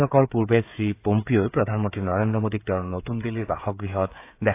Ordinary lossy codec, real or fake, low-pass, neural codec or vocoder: AAC, 24 kbps; fake; 3.6 kHz; codec, 16 kHz, 16 kbps, FreqCodec, smaller model